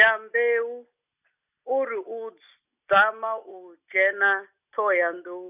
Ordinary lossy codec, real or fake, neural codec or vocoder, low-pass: none; real; none; 3.6 kHz